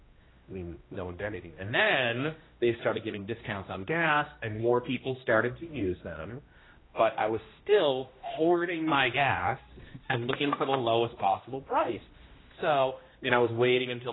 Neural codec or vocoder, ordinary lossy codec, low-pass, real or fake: codec, 16 kHz, 1 kbps, X-Codec, HuBERT features, trained on general audio; AAC, 16 kbps; 7.2 kHz; fake